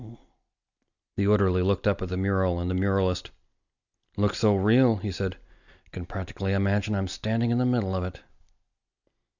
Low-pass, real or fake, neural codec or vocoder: 7.2 kHz; real; none